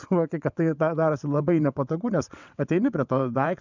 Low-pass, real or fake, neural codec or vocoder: 7.2 kHz; fake; vocoder, 22.05 kHz, 80 mel bands, WaveNeXt